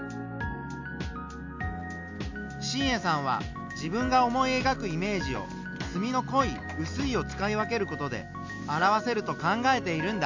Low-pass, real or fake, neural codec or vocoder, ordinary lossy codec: 7.2 kHz; real; none; MP3, 64 kbps